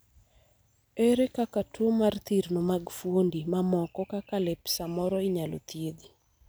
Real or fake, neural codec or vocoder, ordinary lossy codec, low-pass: real; none; none; none